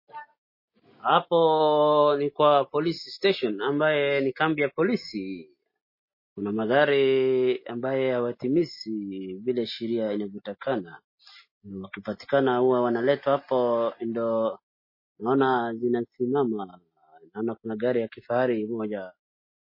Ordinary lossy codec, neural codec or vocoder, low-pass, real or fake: MP3, 24 kbps; none; 5.4 kHz; real